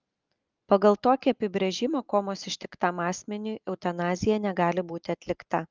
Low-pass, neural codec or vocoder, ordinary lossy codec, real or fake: 7.2 kHz; none; Opus, 24 kbps; real